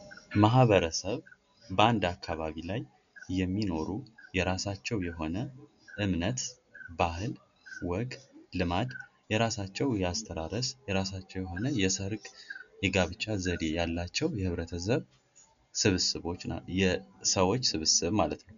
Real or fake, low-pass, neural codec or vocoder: real; 7.2 kHz; none